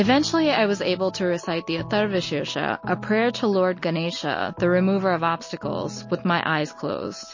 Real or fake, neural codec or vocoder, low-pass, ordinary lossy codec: real; none; 7.2 kHz; MP3, 32 kbps